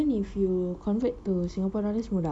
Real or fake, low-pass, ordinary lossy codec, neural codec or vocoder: real; 9.9 kHz; none; none